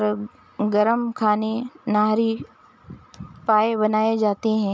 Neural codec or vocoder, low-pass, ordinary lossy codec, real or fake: none; none; none; real